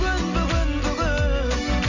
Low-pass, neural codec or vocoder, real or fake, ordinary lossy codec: 7.2 kHz; none; real; none